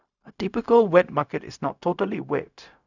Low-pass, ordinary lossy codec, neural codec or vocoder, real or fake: 7.2 kHz; none; codec, 16 kHz, 0.4 kbps, LongCat-Audio-Codec; fake